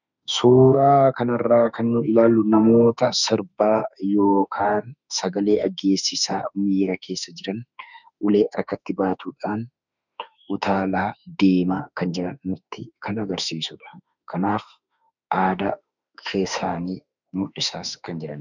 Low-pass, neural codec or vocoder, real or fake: 7.2 kHz; autoencoder, 48 kHz, 32 numbers a frame, DAC-VAE, trained on Japanese speech; fake